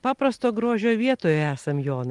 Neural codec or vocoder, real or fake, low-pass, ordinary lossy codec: none; real; 10.8 kHz; Opus, 32 kbps